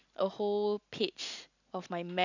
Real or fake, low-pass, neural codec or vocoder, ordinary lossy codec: real; 7.2 kHz; none; none